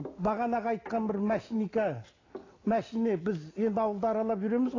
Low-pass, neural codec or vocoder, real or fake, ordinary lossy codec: 7.2 kHz; none; real; AAC, 32 kbps